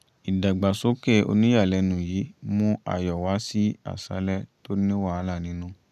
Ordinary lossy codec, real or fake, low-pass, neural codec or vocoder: none; real; 14.4 kHz; none